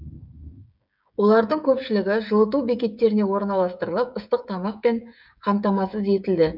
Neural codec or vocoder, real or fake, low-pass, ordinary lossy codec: codec, 16 kHz, 16 kbps, FreqCodec, smaller model; fake; 5.4 kHz; none